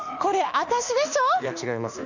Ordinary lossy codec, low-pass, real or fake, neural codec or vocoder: none; 7.2 kHz; fake; autoencoder, 48 kHz, 32 numbers a frame, DAC-VAE, trained on Japanese speech